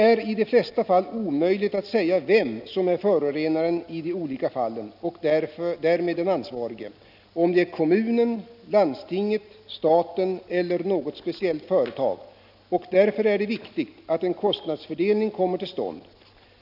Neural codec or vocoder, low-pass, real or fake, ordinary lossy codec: none; 5.4 kHz; real; none